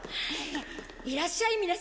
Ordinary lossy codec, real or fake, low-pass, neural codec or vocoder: none; real; none; none